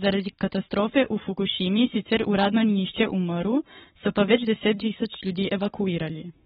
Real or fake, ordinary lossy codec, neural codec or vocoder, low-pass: fake; AAC, 16 kbps; codec, 44.1 kHz, 7.8 kbps, Pupu-Codec; 19.8 kHz